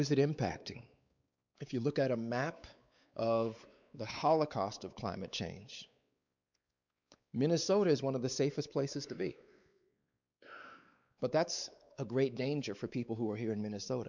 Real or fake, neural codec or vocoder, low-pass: fake; codec, 16 kHz, 4 kbps, X-Codec, WavLM features, trained on Multilingual LibriSpeech; 7.2 kHz